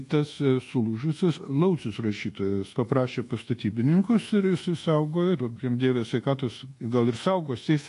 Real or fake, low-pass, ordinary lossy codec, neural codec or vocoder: fake; 10.8 kHz; AAC, 48 kbps; codec, 24 kHz, 1.2 kbps, DualCodec